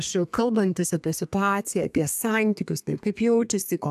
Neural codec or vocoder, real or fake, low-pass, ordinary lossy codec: codec, 32 kHz, 1.9 kbps, SNAC; fake; 14.4 kHz; AAC, 96 kbps